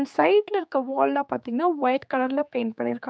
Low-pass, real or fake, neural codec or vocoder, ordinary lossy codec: none; fake; codec, 16 kHz, 4 kbps, X-Codec, HuBERT features, trained on general audio; none